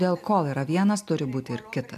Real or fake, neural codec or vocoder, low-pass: real; none; 14.4 kHz